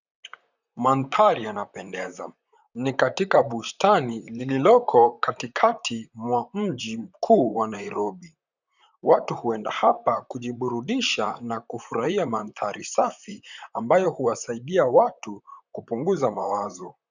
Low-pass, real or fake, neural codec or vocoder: 7.2 kHz; fake; vocoder, 44.1 kHz, 128 mel bands, Pupu-Vocoder